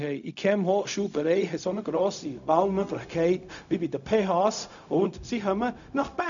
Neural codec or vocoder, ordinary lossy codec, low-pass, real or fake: codec, 16 kHz, 0.4 kbps, LongCat-Audio-Codec; none; 7.2 kHz; fake